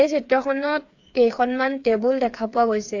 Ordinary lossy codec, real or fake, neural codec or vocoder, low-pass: AAC, 48 kbps; fake; codec, 16 kHz, 8 kbps, FreqCodec, smaller model; 7.2 kHz